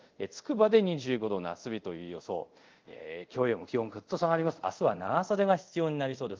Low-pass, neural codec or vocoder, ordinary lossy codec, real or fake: 7.2 kHz; codec, 24 kHz, 0.5 kbps, DualCodec; Opus, 32 kbps; fake